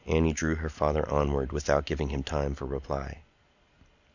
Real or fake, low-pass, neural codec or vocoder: real; 7.2 kHz; none